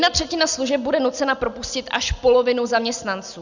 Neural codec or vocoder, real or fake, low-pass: none; real; 7.2 kHz